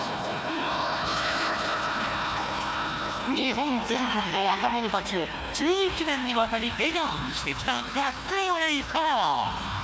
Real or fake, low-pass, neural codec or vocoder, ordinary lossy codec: fake; none; codec, 16 kHz, 1 kbps, FunCodec, trained on Chinese and English, 50 frames a second; none